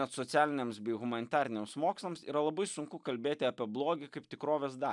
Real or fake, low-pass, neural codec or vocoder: real; 10.8 kHz; none